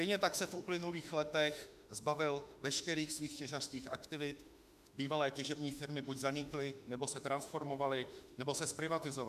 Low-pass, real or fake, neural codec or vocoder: 14.4 kHz; fake; autoencoder, 48 kHz, 32 numbers a frame, DAC-VAE, trained on Japanese speech